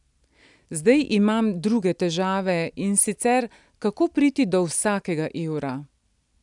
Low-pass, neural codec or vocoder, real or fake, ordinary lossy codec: 10.8 kHz; none; real; none